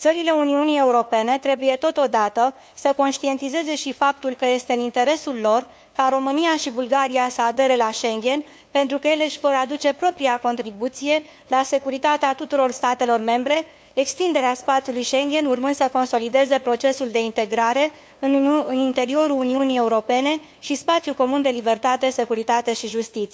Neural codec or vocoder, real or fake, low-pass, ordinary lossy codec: codec, 16 kHz, 2 kbps, FunCodec, trained on LibriTTS, 25 frames a second; fake; none; none